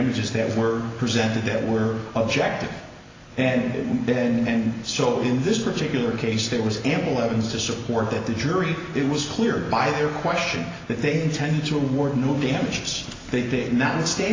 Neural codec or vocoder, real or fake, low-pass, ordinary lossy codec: none; real; 7.2 kHz; AAC, 32 kbps